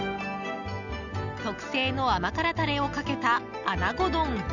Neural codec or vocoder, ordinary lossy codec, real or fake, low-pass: none; none; real; 7.2 kHz